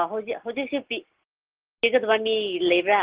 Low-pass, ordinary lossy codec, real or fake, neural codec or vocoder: 3.6 kHz; Opus, 16 kbps; real; none